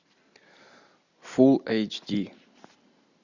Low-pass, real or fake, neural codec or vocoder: 7.2 kHz; real; none